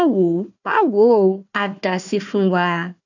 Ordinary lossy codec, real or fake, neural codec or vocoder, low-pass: none; fake; codec, 16 kHz, 1 kbps, FunCodec, trained on Chinese and English, 50 frames a second; 7.2 kHz